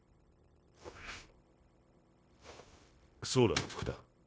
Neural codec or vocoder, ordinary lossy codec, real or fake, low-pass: codec, 16 kHz, 0.9 kbps, LongCat-Audio-Codec; none; fake; none